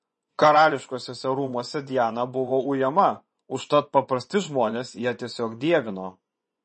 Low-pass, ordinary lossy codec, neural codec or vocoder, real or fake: 10.8 kHz; MP3, 32 kbps; vocoder, 24 kHz, 100 mel bands, Vocos; fake